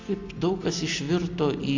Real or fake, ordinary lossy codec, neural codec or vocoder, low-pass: real; AAC, 48 kbps; none; 7.2 kHz